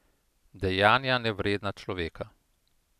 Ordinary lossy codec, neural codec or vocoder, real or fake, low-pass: none; none; real; 14.4 kHz